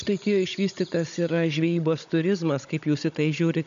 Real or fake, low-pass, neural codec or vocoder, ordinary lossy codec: fake; 7.2 kHz; codec, 16 kHz, 16 kbps, FunCodec, trained on Chinese and English, 50 frames a second; AAC, 96 kbps